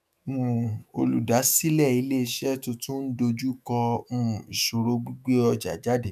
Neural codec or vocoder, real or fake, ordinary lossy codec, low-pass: autoencoder, 48 kHz, 128 numbers a frame, DAC-VAE, trained on Japanese speech; fake; none; 14.4 kHz